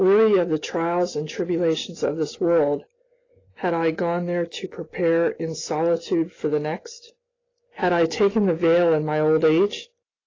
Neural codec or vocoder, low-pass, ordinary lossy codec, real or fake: none; 7.2 kHz; AAC, 32 kbps; real